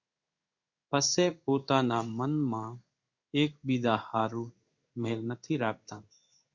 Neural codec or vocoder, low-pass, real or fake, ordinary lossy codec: codec, 16 kHz in and 24 kHz out, 1 kbps, XY-Tokenizer; 7.2 kHz; fake; Opus, 64 kbps